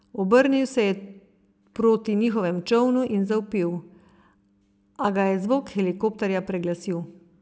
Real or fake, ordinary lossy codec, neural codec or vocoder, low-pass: real; none; none; none